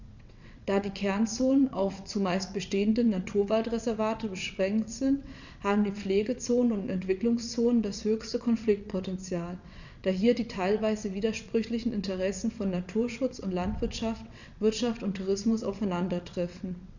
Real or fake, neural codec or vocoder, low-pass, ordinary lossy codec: fake; vocoder, 22.05 kHz, 80 mel bands, WaveNeXt; 7.2 kHz; none